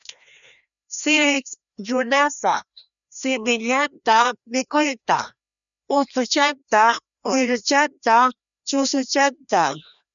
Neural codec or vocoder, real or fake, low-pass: codec, 16 kHz, 1 kbps, FreqCodec, larger model; fake; 7.2 kHz